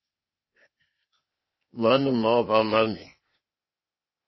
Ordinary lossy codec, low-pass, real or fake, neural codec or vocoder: MP3, 24 kbps; 7.2 kHz; fake; codec, 16 kHz, 0.8 kbps, ZipCodec